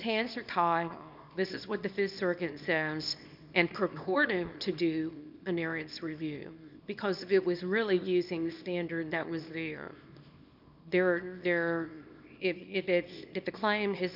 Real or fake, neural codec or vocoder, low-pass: fake; codec, 24 kHz, 0.9 kbps, WavTokenizer, small release; 5.4 kHz